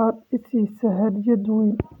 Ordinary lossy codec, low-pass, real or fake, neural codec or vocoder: none; 19.8 kHz; real; none